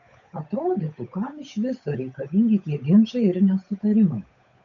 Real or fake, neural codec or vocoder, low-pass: fake; codec, 16 kHz, 8 kbps, FunCodec, trained on Chinese and English, 25 frames a second; 7.2 kHz